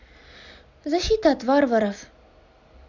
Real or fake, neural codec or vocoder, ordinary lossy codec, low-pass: real; none; none; 7.2 kHz